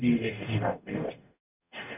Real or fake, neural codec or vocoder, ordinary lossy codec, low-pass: fake; codec, 44.1 kHz, 0.9 kbps, DAC; none; 3.6 kHz